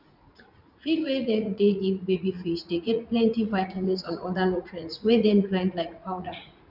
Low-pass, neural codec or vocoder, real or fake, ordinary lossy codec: 5.4 kHz; vocoder, 44.1 kHz, 80 mel bands, Vocos; fake; none